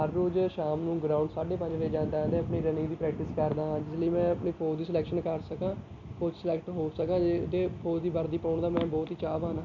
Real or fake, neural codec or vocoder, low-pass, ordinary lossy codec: real; none; 7.2 kHz; none